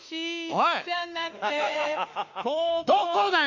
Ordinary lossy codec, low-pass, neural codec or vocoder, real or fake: none; 7.2 kHz; autoencoder, 48 kHz, 32 numbers a frame, DAC-VAE, trained on Japanese speech; fake